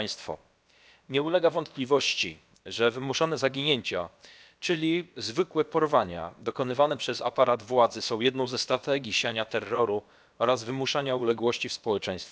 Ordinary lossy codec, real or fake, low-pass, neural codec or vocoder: none; fake; none; codec, 16 kHz, about 1 kbps, DyCAST, with the encoder's durations